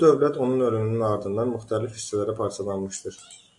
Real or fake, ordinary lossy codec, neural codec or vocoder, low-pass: real; AAC, 64 kbps; none; 10.8 kHz